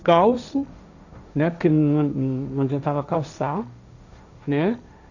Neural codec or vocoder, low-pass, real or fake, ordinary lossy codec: codec, 16 kHz, 1.1 kbps, Voila-Tokenizer; 7.2 kHz; fake; none